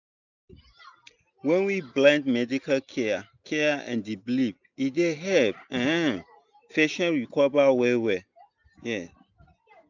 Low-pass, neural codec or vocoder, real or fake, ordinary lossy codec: 7.2 kHz; none; real; none